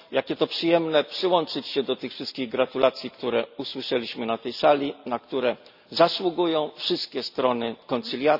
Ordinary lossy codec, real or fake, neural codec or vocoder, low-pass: none; real; none; 5.4 kHz